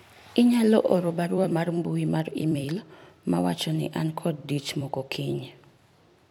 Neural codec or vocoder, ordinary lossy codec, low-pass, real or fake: vocoder, 44.1 kHz, 128 mel bands every 256 samples, BigVGAN v2; none; 19.8 kHz; fake